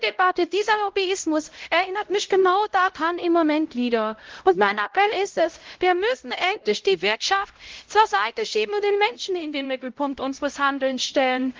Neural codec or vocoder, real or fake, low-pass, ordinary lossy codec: codec, 16 kHz, 0.5 kbps, X-Codec, HuBERT features, trained on LibriSpeech; fake; 7.2 kHz; Opus, 16 kbps